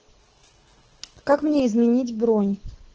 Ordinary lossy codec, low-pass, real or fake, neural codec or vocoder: Opus, 16 kbps; 7.2 kHz; fake; codec, 24 kHz, 6 kbps, HILCodec